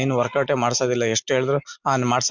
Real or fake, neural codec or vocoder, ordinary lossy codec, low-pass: real; none; none; 7.2 kHz